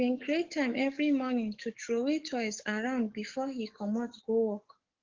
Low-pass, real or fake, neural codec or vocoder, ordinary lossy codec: 7.2 kHz; fake; codec, 44.1 kHz, 7.8 kbps, DAC; Opus, 16 kbps